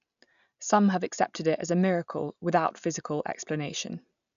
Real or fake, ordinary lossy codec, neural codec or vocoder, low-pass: real; none; none; 7.2 kHz